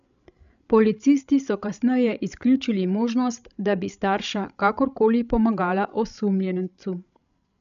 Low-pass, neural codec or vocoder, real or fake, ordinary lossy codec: 7.2 kHz; codec, 16 kHz, 16 kbps, FreqCodec, larger model; fake; none